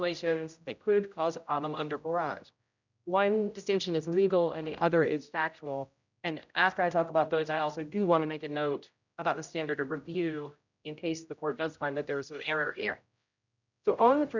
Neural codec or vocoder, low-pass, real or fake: codec, 16 kHz, 0.5 kbps, X-Codec, HuBERT features, trained on general audio; 7.2 kHz; fake